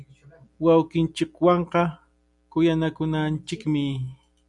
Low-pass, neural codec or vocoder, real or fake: 10.8 kHz; none; real